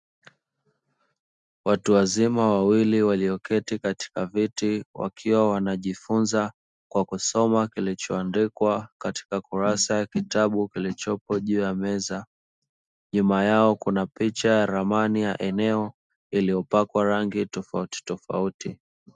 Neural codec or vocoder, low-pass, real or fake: none; 10.8 kHz; real